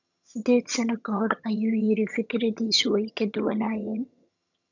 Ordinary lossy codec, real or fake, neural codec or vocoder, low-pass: none; fake; vocoder, 22.05 kHz, 80 mel bands, HiFi-GAN; 7.2 kHz